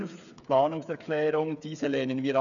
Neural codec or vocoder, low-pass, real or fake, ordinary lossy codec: codec, 16 kHz, 8 kbps, FreqCodec, smaller model; 7.2 kHz; fake; none